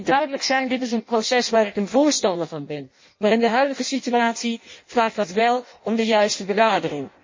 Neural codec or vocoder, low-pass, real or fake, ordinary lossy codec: codec, 16 kHz in and 24 kHz out, 0.6 kbps, FireRedTTS-2 codec; 7.2 kHz; fake; MP3, 32 kbps